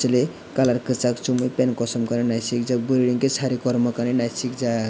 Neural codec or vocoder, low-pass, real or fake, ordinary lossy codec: none; none; real; none